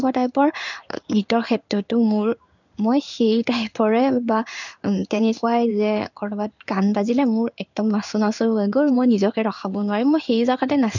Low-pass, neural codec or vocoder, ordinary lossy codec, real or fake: 7.2 kHz; codec, 16 kHz in and 24 kHz out, 1 kbps, XY-Tokenizer; none; fake